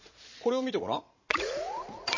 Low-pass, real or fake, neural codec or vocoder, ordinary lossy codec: 7.2 kHz; fake; vocoder, 44.1 kHz, 80 mel bands, Vocos; MP3, 32 kbps